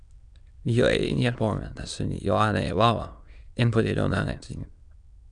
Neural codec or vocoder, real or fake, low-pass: autoencoder, 22.05 kHz, a latent of 192 numbers a frame, VITS, trained on many speakers; fake; 9.9 kHz